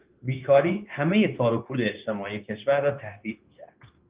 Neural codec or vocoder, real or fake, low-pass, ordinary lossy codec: codec, 16 kHz, 0.9 kbps, LongCat-Audio-Codec; fake; 3.6 kHz; Opus, 32 kbps